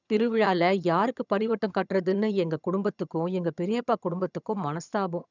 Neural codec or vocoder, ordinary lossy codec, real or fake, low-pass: vocoder, 22.05 kHz, 80 mel bands, HiFi-GAN; none; fake; 7.2 kHz